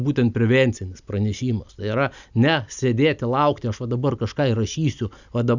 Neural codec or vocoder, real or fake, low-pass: none; real; 7.2 kHz